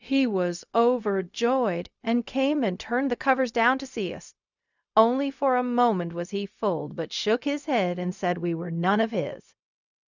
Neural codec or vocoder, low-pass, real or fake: codec, 16 kHz, 0.4 kbps, LongCat-Audio-Codec; 7.2 kHz; fake